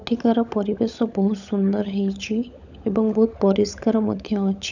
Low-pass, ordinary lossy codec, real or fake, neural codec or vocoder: 7.2 kHz; none; fake; codec, 16 kHz, 16 kbps, FunCodec, trained on LibriTTS, 50 frames a second